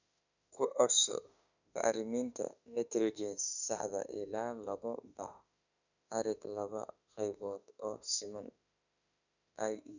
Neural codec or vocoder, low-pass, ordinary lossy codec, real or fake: autoencoder, 48 kHz, 32 numbers a frame, DAC-VAE, trained on Japanese speech; 7.2 kHz; none; fake